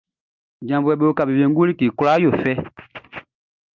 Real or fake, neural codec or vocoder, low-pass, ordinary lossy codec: real; none; 7.2 kHz; Opus, 24 kbps